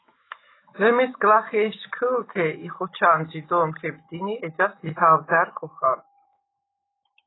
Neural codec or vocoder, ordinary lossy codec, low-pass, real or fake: autoencoder, 48 kHz, 128 numbers a frame, DAC-VAE, trained on Japanese speech; AAC, 16 kbps; 7.2 kHz; fake